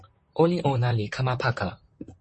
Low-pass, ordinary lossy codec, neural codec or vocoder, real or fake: 10.8 kHz; MP3, 32 kbps; vocoder, 44.1 kHz, 128 mel bands, Pupu-Vocoder; fake